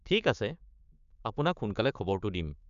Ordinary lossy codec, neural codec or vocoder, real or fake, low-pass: none; codec, 16 kHz, 6 kbps, DAC; fake; 7.2 kHz